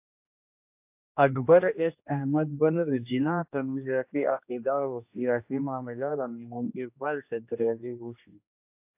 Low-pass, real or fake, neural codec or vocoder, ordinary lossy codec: 3.6 kHz; fake; codec, 16 kHz, 1 kbps, X-Codec, HuBERT features, trained on general audio; AAC, 32 kbps